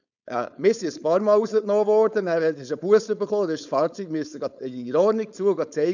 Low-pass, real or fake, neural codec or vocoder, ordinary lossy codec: 7.2 kHz; fake; codec, 16 kHz, 4.8 kbps, FACodec; none